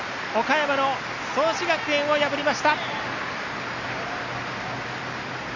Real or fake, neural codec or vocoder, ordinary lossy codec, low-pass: real; none; none; 7.2 kHz